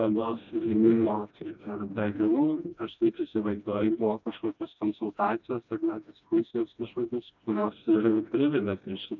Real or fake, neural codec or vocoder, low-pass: fake; codec, 16 kHz, 1 kbps, FreqCodec, smaller model; 7.2 kHz